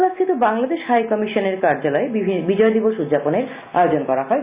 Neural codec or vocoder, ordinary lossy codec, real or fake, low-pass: none; Opus, 64 kbps; real; 3.6 kHz